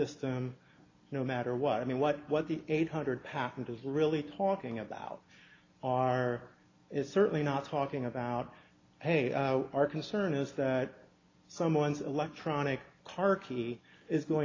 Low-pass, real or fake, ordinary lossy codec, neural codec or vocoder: 7.2 kHz; real; AAC, 32 kbps; none